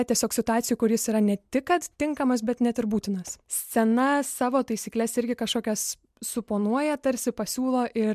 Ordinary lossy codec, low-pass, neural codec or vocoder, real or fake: MP3, 96 kbps; 14.4 kHz; none; real